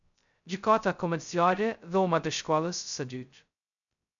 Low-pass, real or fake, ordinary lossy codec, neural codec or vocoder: 7.2 kHz; fake; MP3, 96 kbps; codec, 16 kHz, 0.2 kbps, FocalCodec